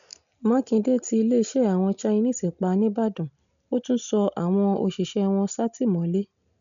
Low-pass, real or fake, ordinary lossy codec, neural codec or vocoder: 7.2 kHz; real; none; none